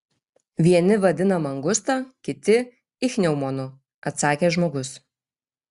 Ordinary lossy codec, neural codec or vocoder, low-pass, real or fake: Opus, 64 kbps; none; 10.8 kHz; real